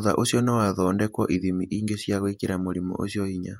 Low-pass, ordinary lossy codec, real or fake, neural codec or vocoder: 19.8 kHz; MP3, 64 kbps; fake; vocoder, 48 kHz, 128 mel bands, Vocos